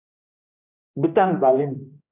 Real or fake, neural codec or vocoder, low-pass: fake; codec, 16 kHz, 1 kbps, X-Codec, HuBERT features, trained on general audio; 3.6 kHz